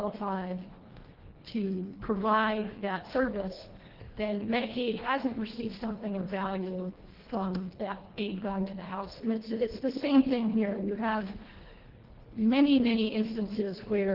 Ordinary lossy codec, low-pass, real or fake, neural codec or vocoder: Opus, 16 kbps; 5.4 kHz; fake; codec, 24 kHz, 1.5 kbps, HILCodec